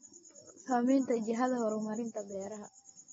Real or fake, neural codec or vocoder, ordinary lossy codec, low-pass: real; none; AAC, 24 kbps; 14.4 kHz